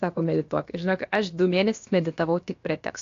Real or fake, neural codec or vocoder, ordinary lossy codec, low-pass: fake; codec, 16 kHz, about 1 kbps, DyCAST, with the encoder's durations; AAC, 48 kbps; 7.2 kHz